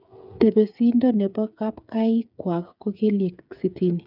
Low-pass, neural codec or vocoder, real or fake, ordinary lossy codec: 5.4 kHz; codec, 16 kHz, 8 kbps, FreqCodec, smaller model; fake; none